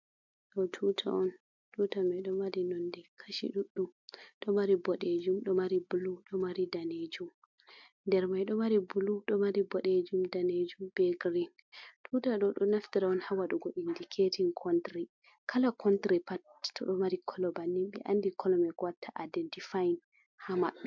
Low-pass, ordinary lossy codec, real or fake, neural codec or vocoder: 7.2 kHz; MP3, 64 kbps; real; none